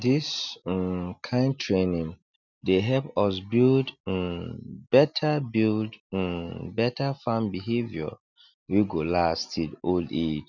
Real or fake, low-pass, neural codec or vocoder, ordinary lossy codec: real; 7.2 kHz; none; none